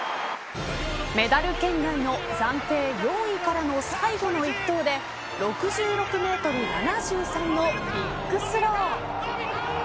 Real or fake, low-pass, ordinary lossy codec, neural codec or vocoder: real; none; none; none